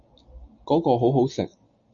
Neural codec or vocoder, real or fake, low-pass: none; real; 7.2 kHz